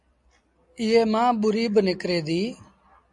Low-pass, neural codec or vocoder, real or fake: 10.8 kHz; none; real